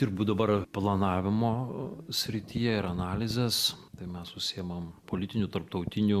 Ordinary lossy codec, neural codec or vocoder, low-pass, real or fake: Opus, 64 kbps; none; 14.4 kHz; real